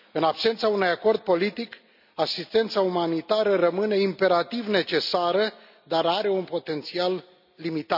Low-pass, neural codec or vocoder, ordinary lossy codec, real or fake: 5.4 kHz; none; none; real